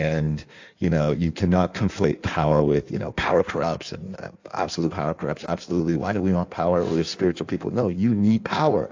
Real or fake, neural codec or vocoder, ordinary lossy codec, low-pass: fake; codec, 16 kHz in and 24 kHz out, 1.1 kbps, FireRedTTS-2 codec; AAC, 48 kbps; 7.2 kHz